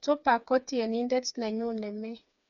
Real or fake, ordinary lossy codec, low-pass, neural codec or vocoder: fake; none; 7.2 kHz; codec, 16 kHz, 4 kbps, FreqCodec, smaller model